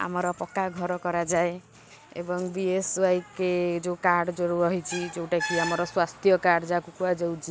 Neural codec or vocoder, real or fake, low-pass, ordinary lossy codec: none; real; none; none